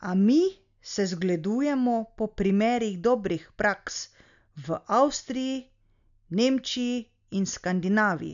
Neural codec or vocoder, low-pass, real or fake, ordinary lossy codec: none; 7.2 kHz; real; none